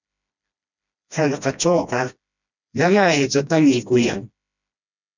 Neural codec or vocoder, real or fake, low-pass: codec, 16 kHz, 1 kbps, FreqCodec, smaller model; fake; 7.2 kHz